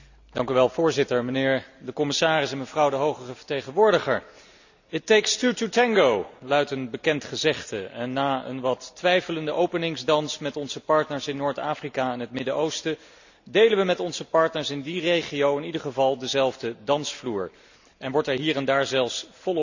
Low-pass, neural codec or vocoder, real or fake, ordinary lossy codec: 7.2 kHz; none; real; none